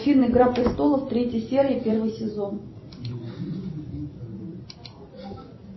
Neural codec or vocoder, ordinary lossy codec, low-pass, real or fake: vocoder, 44.1 kHz, 128 mel bands every 256 samples, BigVGAN v2; MP3, 24 kbps; 7.2 kHz; fake